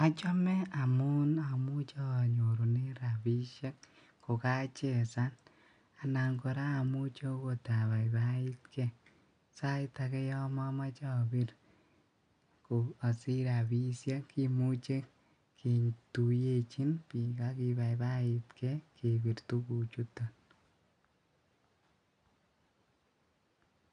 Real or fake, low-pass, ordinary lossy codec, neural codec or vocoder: real; 9.9 kHz; none; none